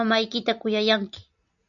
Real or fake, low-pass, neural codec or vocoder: real; 7.2 kHz; none